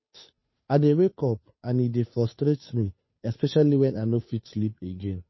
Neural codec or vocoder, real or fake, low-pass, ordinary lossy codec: codec, 16 kHz, 2 kbps, FunCodec, trained on Chinese and English, 25 frames a second; fake; 7.2 kHz; MP3, 24 kbps